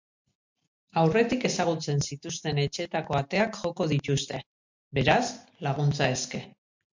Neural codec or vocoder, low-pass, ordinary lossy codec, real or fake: none; 7.2 kHz; MP3, 64 kbps; real